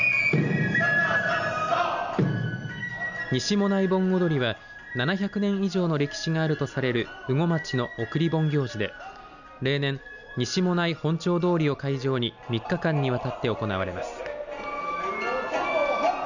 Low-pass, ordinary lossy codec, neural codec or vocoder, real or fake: 7.2 kHz; none; none; real